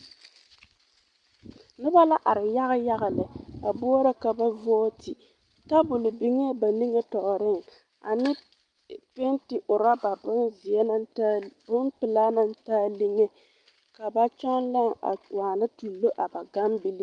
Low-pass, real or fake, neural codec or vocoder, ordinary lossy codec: 9.9 kHz; real; none; Opus, 32 kbps